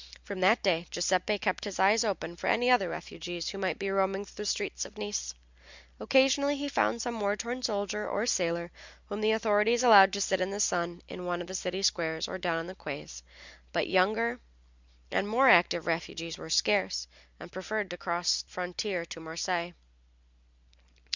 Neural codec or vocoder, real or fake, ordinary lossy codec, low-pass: none; real; Opus, 64 kbps; 7.2 kHz